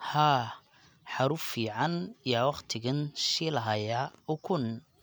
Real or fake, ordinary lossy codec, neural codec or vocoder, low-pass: real; none; none; none